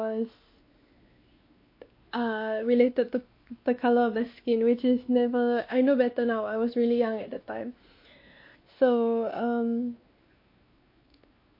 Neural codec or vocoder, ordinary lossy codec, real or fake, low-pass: codec, 16 kHz, 2 kbps, X-Codec, WavLM features, trained on Multilingual LibriSpeech; MP3, 32 kbps; fake; 5.4 kHz